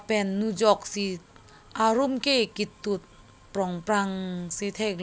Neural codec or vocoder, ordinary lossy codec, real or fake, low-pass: none; none; real; none